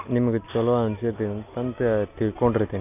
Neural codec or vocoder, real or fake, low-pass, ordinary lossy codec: none; real; 3.6 kHz; none